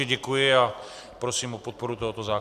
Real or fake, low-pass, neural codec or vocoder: real; 14.4 kHz; none